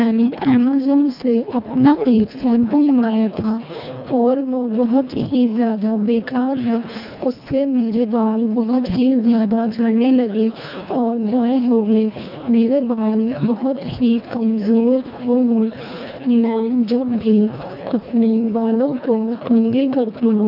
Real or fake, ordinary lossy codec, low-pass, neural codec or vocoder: fake; none; 5.4 kHz; codec, 24 kHz, 1.5 kbps, HILCodec